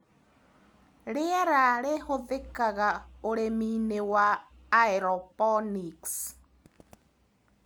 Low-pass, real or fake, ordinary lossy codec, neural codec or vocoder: none; real; none; none